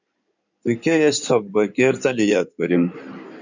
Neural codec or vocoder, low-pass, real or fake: codec, 16 kHz in and 24 kHz out, 2.2 kbps, FireRedTTS-2 codec; 7.2 kHz; fake